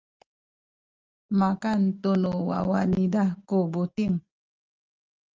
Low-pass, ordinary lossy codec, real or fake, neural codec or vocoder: 7.2 kHz; Opus, 24 kbps; real; none